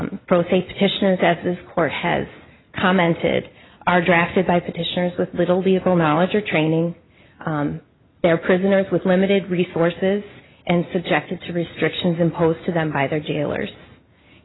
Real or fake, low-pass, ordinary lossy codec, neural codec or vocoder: real; 7.2 kHz; AAC, 16 kbps; none